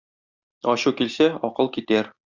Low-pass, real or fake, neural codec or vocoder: 7.2 kHz; real; none